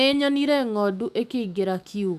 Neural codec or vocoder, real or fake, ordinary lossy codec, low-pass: autoencoder, 48 kHz, 128 numbers a frame, DAC-VAE, trained on Japanese speech; fake; none; 14.4 kHz